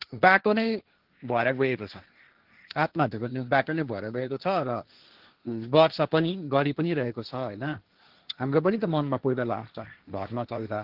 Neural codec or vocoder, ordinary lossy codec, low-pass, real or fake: codec, 16 kHz, 1.1 kbps, Voila-Tokenizer; Opus, 16 kbps; 5.4 kHz; fake